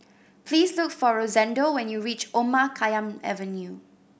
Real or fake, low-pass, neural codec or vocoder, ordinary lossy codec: real; none; none; none